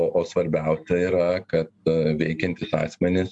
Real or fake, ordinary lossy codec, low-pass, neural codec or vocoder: real; MP3, 64 kbps; 10.8 kHz; none